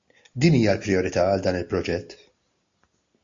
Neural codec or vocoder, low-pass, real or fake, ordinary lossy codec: none; 7.2 kHz; real; AAC, 64 kbps